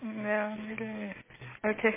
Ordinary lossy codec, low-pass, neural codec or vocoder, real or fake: MP3, 16 kbps; 3.6 kHz; none; real